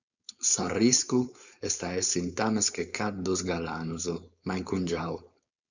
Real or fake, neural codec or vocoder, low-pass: fake; codec, 16 kHz, 4.8 kbps, FACodec; 7.2 kHz